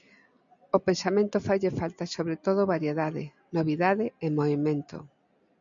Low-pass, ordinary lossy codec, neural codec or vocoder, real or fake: 7.2 kHz; MP3, 96 kbps; none; real